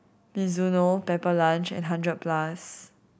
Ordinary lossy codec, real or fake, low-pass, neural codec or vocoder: none; real; none; none